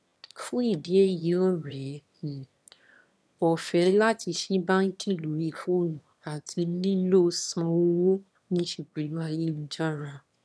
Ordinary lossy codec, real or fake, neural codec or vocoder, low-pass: none; fake; autoencoder, 22.05 kHz, a latent of 192 numbers a frame, VITS, trained on one speaker; none